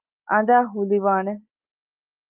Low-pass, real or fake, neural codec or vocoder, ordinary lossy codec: 3.6 kHz; real; none; Opus, 32 kbps